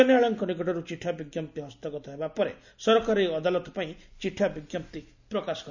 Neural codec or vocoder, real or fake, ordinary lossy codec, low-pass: none; real; none; 7.2 kHz